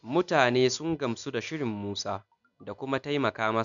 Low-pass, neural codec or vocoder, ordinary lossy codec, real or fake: 7.2 kHz; none; none; real